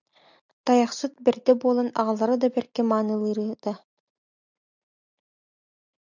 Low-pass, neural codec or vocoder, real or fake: 7.2 kHz; none; real